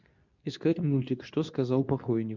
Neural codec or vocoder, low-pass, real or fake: codec, 24 kHz, 0.9 kbps, WavTokenizer, medium speech release version 2; 7.2 kHz; fake